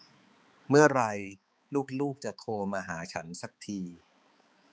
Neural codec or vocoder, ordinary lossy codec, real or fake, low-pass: codec, 16 kHz, 4 kbps, X-Codec, HuBERT features, trained on balanced general audio; none; fake; none